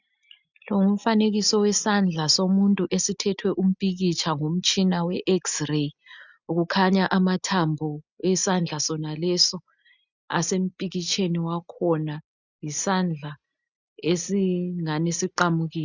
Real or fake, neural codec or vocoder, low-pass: real; none; 7.2 kHz